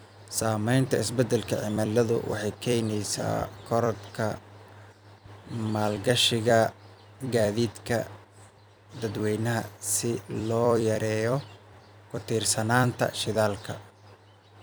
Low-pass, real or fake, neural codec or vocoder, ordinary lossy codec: none; fake; vocoder, 44.1 kHz, 128 mel bands every 256 samples, BigVGAN v2; none